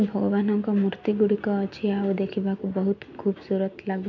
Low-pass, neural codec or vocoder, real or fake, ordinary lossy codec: 7.2 kHz; none; real; none